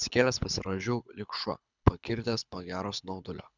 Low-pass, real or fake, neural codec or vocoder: 7.2 kHz; fake; codec, 24 kHz, 6 kbps, HILCodec